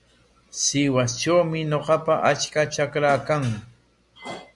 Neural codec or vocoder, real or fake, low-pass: none; real; 10.8 kHz